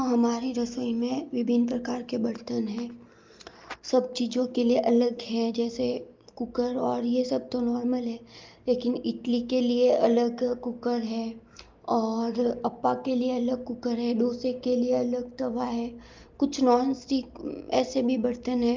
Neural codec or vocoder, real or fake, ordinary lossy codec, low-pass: none; real; Opus, 32 kbps; 7.2 kHz